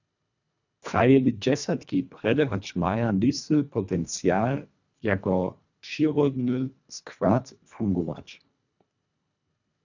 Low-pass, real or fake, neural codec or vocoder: 7.2 kHz; fake; codec, 24 kHz, 1.5 kbps, HILCodec